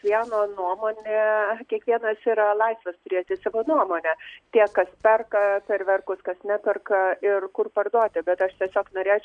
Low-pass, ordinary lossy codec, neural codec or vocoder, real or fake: 9.9 kHz; MP3, 96 kbps; none; real